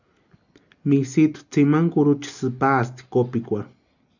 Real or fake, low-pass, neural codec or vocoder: real; 7.2 kHz; none